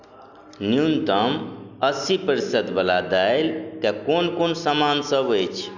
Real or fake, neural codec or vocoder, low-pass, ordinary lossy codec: real; none; 7.2 kHz; none